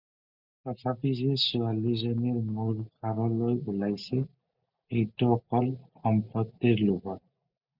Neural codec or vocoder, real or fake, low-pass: none; real; 5.4 kHz